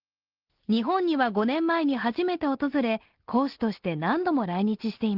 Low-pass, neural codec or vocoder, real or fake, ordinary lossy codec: 5.4 kHz; none; real; Opus, 16 kbps